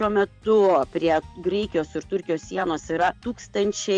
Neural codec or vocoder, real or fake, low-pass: vocoder, 44.1 kHz, 128 mel bands every 512 samples, BigVGAN v2; fake; 9.9 kHz